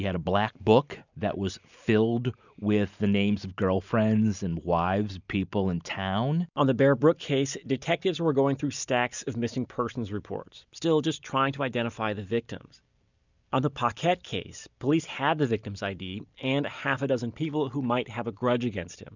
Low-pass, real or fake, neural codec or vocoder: 7.2 kHz; real; none